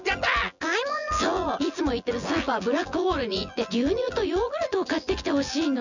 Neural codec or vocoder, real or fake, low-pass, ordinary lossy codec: vocoder, 24 kHz, 100 mel bands, Vocos; fake; 7.2 kHz; none